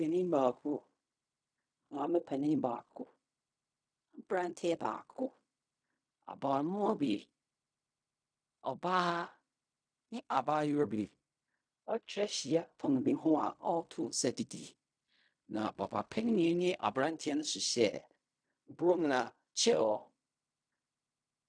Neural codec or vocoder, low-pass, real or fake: codec, 16 kHz in and 24 kHz out, 0.4 kbps, LongCat-Audio-Codec, fine tuned four codebook decoder; 9.9 kHz; fake